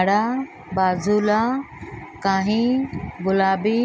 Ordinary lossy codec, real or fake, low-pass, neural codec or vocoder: none; real; none; none